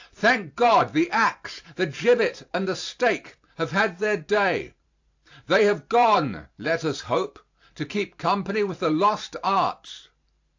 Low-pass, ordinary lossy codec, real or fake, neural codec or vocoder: 7.2 kHz; AAC, 48 kbps; fake; vocoder, 44.1 kHz, 128 mel bands every 512 samples, BigVGAN v2